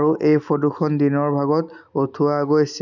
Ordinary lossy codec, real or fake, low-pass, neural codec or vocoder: none; real; 7.2 kHz; none